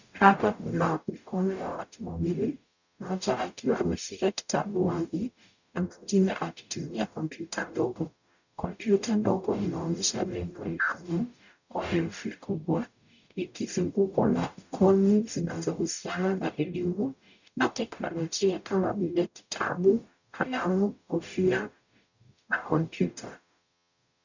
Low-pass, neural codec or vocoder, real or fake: 7.2 kHz; codec, 44.1 kHz, 0.9 kbps, DAC; fake